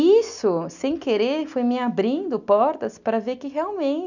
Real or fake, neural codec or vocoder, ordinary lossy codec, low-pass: real; none; none; 7.2 kHz